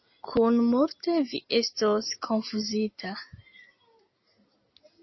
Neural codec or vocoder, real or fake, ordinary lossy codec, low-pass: none; real; MP3, 24 kbps; 7.2 kHz